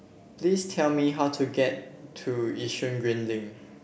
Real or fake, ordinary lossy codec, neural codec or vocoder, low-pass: real; none; none; none